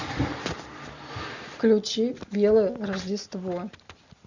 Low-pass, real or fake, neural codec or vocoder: 7.2 kHz; real; none